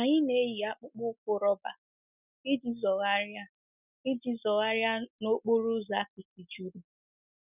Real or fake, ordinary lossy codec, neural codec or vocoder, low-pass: real; none; none; 3.6 kHz